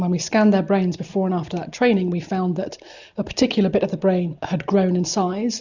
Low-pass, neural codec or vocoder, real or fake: 7.2 kHz; none; real